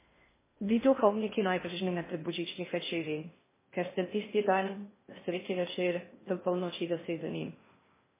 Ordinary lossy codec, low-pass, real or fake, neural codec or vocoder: MP3, 16 kbps; 3.6 kHz; fake; codec, 16 kHz in and 24 kHz out, 0.6 kbps, FocalCodec, streaming, 2048 codes